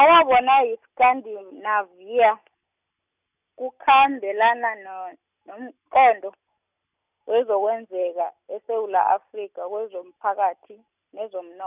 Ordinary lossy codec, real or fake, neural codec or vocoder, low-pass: none; real; none; 3.6 kHz